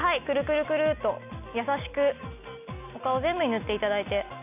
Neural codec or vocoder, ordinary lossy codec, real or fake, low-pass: none; none; real; 3.6 kHz